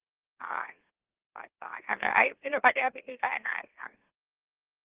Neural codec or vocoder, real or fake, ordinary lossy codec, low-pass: autoencoder, 44.1 kHz, a latent of 192 numbers a frame, MeloTTS; fake; Opus, 16 kbps; 3.6 kHz